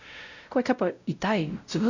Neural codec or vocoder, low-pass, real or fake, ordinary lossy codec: codec, 16 kHz, 0.5 kbps, X-Codec, WavLM features, trained on Multilingual LibriSpeech; 7.2 kHz; fake; none